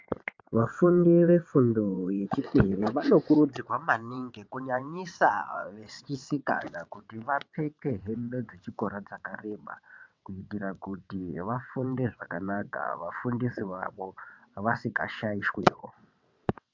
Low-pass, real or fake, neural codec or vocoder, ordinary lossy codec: 7.2 kHz; fake; vocoder, 22.05 kHz, 80 mel bands, WaveNeXt; MP3, 64 kbps